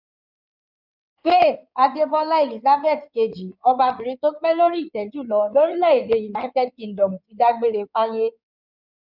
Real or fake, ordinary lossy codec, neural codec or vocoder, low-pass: fake; none; codec, 16 kHz in and 24 kHz out, 2.2 kbps, FireRedTTS-2 codec; 5.4 kHz